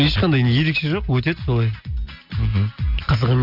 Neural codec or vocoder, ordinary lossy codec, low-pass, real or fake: none; Opus, 64 kbps; 5.4 kHz; real